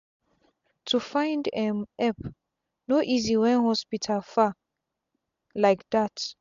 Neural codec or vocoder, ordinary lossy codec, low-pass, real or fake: none; MP3, 64 kbps; 7.2 kHz; real